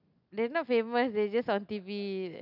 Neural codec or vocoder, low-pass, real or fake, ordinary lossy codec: none; 5.4 kHz; real; none